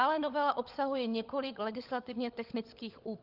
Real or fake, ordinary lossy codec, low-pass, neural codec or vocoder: fake; Opus, 24 kbps; 5.4 kHz; codec, 16 kHz, 16 kbps, FunCodec, trained on LibriTTS, 50 frames a second